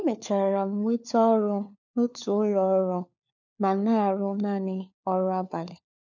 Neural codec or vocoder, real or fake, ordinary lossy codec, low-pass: codec, 16 kHz, 4 kbps, FunCodec, trained on LibriTTS, 50 frames a second; fake; none; 7.2 kHz